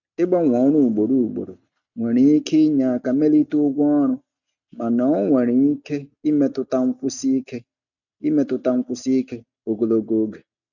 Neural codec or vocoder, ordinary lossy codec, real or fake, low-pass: none; none; real; 7.2 kHz